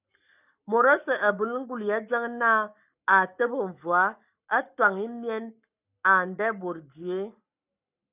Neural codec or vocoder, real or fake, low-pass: codec, 44.1 kHz, 7.8 kbps, Pupu-Codec; fake; 3.6 kHz